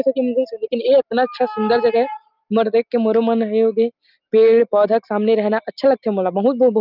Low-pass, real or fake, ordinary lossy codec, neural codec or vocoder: 5.4 kHz; real; Opus, 24 kbps; none